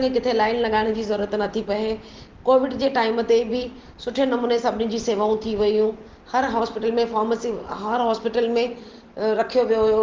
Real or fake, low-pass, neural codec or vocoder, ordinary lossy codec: real; 7.2 kHz; none; Opus, 16 kbps